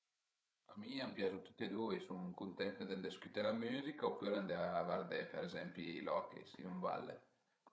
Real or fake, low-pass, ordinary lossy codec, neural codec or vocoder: fake; none; none; codec, 16 kHz, 8 kbps, FreqCodec, larger model